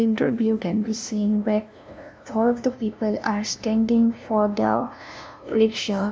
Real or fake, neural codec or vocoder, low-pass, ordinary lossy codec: fake; codec, 16 kHz, 0.5 kbps, FunCodec, trained on LibriTTS, 25 frames a second; none; none